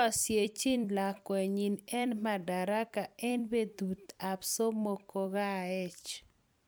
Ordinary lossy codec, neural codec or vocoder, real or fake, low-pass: none; vocoder, 44.1 kHz, 128 mel bands every 256 samples, BigVGAN v2; fake; none